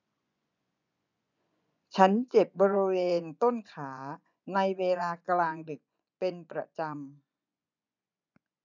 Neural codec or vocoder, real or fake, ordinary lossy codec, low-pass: vocoder, 24 kHz, 100 mel bands, Vocos; fake; none; 7.2 kHz